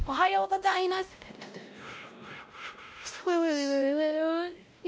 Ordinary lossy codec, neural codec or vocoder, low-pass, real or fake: none; codec, 16 kHz, 0.5 kbps, X-Codec, WavLM features, trained on Multilingual LibriSpeech; none; fake